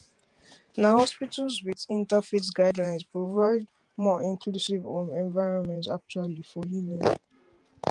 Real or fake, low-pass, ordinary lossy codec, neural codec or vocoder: fake; 10.8 kHz; Opus, 32 kbps; vocoder, 48 kHz, 128 mel bands, Vocos